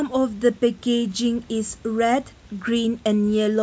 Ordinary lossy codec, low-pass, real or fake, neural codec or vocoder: none; none; real; none